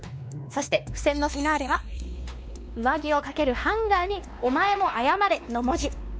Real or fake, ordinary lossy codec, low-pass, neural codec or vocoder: fake; none; none; codec, 16 kHz, 2 kbps, X-Codec, WavLM features, trained on Multilingual LibriSpeech